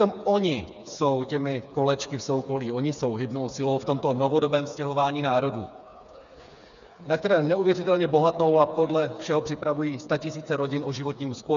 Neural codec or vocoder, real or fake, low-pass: codec, 16 kHz, 4 kbps, FreqCodec, smaller model; fake; 7.2 kHz